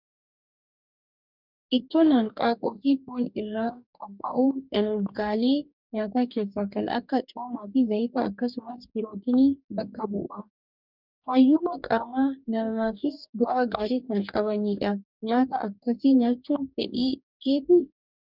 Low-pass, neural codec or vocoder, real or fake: 5.4 kHz; codec, 44.1 kHz, 2.6 kbps, DAC; fake